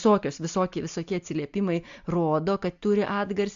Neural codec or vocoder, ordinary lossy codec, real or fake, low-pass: none; AAC, 64 kbps; real; 7.2 kHz